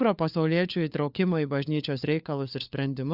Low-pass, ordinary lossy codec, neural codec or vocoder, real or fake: 5.4 kHz; Opus, 64 kbps; codec, 16 kHz, 4 kbps, FunCodec, trained on LibriTTS, 50 frames a second; fake